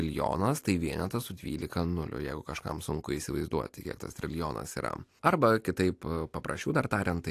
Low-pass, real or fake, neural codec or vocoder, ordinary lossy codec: 14.4 kHz; real; none; AAC, 64 kbps